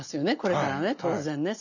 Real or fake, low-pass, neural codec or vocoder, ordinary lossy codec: real; 7.2 kHz; none; none